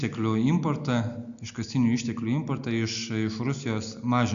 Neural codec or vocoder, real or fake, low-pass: none; real; 7.2 kHz